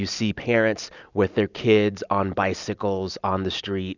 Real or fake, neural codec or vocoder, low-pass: real; none; 7.2 kHz